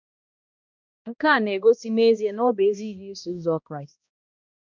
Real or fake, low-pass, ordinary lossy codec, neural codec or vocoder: fake; 7.2 kHz; none; codec, 16 kHz, 1 kbps, X-Codec, HuBERT features, trained on balanced general audio